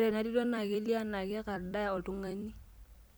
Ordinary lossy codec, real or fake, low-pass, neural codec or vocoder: none; fake; none; vocoder, 44.1 kHz, 128 mel bands every 256 samples, BigVGAN v2